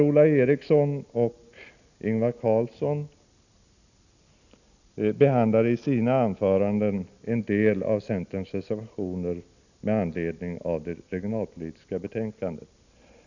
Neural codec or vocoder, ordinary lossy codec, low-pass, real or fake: none; none; 7.2 kHz; real